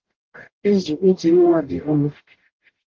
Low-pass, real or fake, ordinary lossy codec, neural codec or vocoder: 7.2 kHz; fake; Opus, 16 kbps; codec, 44.1 kHz, 0.9 kbps, DAC